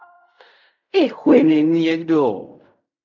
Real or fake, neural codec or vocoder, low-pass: fake; codec, 16 kHz in and 24 kHz out, 0.4 kbps, LongCat-Audio-Codec, fine tuned four codebook decoder; 7.2 kHz